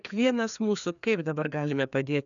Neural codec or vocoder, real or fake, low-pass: codec, 16 kHz, 2 kbps, FreqCodec, larger model; fake; 7.2 kHz